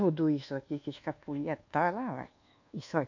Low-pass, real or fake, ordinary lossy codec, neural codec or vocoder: 7.2 kHz; fake; AAC, 48 kbps; codec, 24 kHz, 1.2 kbps, DualCodec